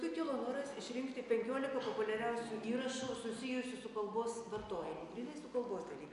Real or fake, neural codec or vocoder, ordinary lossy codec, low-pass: real; none; AAC, 96 kbps; 10.8 kHz